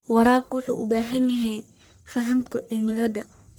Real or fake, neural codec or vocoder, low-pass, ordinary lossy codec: fake; codec, 44.1 kHz, 1.7 kbps, Pupu-Codec; none; none